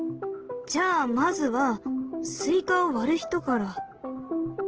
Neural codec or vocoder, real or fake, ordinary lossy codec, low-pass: none; real; Opus, 16 kbps; 7.2 kHz